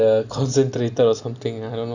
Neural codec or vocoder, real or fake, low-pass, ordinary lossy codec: none; real; 7.2 kHz; none